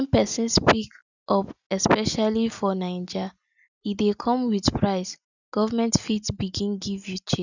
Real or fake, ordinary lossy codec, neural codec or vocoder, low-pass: real; none; none; 7.2 kHz